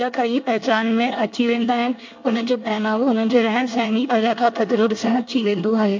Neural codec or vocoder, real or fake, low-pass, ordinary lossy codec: codec, 24 kHz, 1 kbps, SNAC; fake; 7.2 kHz; MP3, 48 kbps